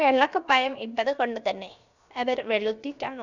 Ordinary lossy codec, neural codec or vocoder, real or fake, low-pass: none; codec, 16 kHz, about 1 kbps, DyCAST, with the encoder's durations; fake; 7.2 kHz